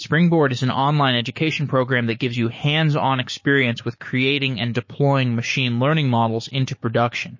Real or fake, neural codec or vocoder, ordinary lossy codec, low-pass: fake; codec, 16 kHz, 6 kbps, DAC; MP3, 32 kbps; 7.2 kHz